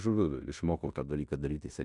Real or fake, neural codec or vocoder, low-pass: fake; codec, 16 kHz in and 24 kHz out, 0.9 kbps, LongCat-Audio-Codec, fine tuned four codebook decoder; 10.8 kHz